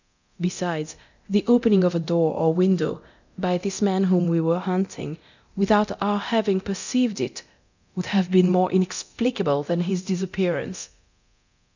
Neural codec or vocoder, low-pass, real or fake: codec, 24 kHz, 0.9 kbps, DualCodec; 7.2 kHz; fake